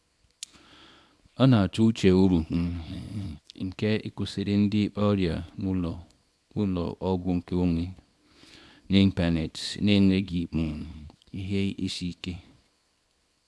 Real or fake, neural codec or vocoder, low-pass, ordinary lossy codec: fake; codec, 24 kHz, 0.9 kbps, WavTokenizer, small release; none; none